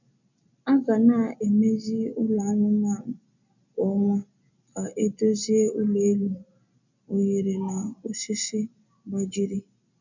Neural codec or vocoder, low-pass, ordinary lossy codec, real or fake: none; 7.2 kHz; none; real